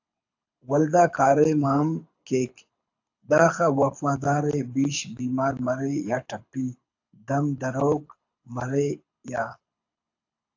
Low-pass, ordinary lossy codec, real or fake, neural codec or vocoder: 7.2 kHz; AAC, 48 kbps; fake; codec, 24 kHz, 6 kbps, HILCodec